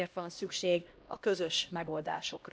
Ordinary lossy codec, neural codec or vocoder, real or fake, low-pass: none; codec, 16 kHz, 1 kbps, X-Codec, HuBERT features, trained on LibriSpeech; fake; none